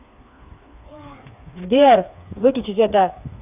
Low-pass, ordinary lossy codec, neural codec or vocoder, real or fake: 3.6 kHz; none; codec, 16 kHz, 4 kbps, FreqCodec, smaller model; fake